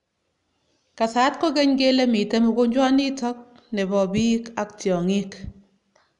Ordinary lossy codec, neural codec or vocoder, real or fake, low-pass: none; none; real; 10.8 kHz